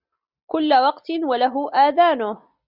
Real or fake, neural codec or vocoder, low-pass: real; none; 5.4 kHz